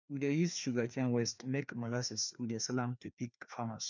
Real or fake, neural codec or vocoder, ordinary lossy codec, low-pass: fake; codec, 16 kHz, 2 kbps, FreqCodec, larger model; none; 7.2 kHz